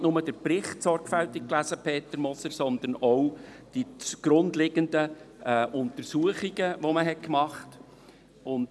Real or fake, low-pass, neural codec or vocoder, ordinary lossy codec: real; none; none; none